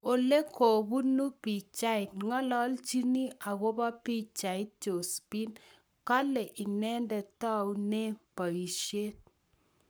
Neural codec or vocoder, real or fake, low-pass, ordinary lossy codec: codec, 44.1 kHz, 7.8 kbps, Pupu-Codec; fake; none; none